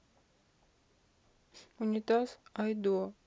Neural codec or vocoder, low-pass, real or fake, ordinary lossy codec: none; none; real; none